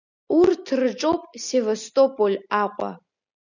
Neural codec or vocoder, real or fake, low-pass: none; real; 7.2 kHz